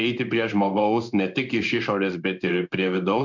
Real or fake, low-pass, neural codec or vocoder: fake; 7.2 kHz; codec, 16 kHz in and 24 kHz out, 1 kbps, XY-Tokenizer